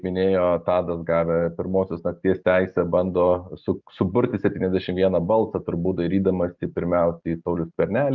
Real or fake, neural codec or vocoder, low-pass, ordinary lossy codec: real; none; 7.2 kHz; Opus, 32 kbps